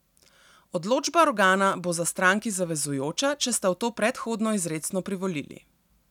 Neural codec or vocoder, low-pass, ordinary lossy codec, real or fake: none; 19.8 kHz; none; real